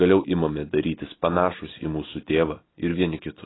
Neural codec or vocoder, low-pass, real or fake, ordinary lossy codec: none; 7.2 kHz; real; AAC, 16 kbps